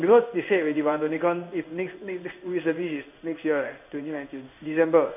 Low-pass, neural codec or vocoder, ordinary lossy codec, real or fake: 3.6 kHz; codec, 16 kHz in and 24 kHz out, 1 kbps, XY-Tokenizer; none; fake